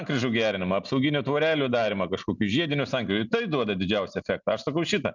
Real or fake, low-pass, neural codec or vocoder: real; 7.2 kHz; none